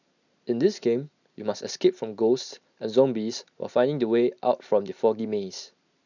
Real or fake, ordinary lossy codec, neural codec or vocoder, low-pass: real; none; none; 7.2 kHz